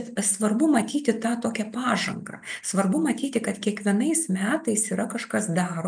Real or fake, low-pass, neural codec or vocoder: real; 9.9 kHz; none